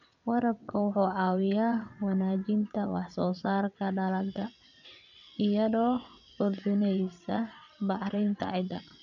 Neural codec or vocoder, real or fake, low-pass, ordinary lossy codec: vocoder, 22.05 kHz, 80 mel bands, WaveNeXt; fake; 7.2 kHz; none